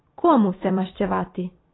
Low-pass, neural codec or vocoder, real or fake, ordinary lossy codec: 7.2 kHz; vocoder, 44.1 kHz, 128 mel bands every 256 samples, BigVGAN v2; fake; AAC, 16 kbps